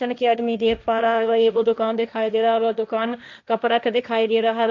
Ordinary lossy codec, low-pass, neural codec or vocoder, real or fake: none; 7.2 kHz; codec, 16 kHz, 1.1 kbps, Voila-Tokenizer; fake